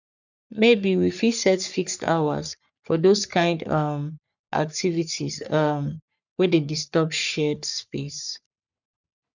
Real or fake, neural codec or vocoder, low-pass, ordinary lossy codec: fake; codec, 44.1 kHz, 3.4 kbps, Pupu-Codec; 7.2 kHz; none